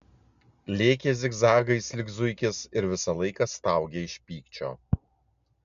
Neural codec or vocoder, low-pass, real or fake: none; 7.2 kHz; real